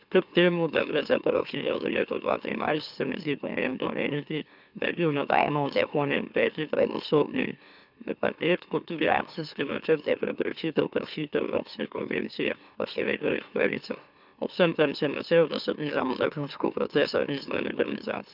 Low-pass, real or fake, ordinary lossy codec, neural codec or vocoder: 5.4 kHz; fake; none; autoencoder, 44.1 kHz, a latent of 192 numbers a frame, MeloTTS